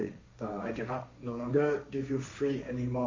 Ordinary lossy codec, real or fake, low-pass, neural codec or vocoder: MP3, 32 kbps; fake; 7.2 kHz; codec, 16 kHz, 1.1 kbps, Voila-Tokenizer